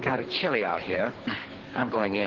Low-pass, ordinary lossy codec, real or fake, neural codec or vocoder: 7.2 kHz; Opus, 16 kbps; fake; codec, 24 kHz, 3 kbps, HILCodec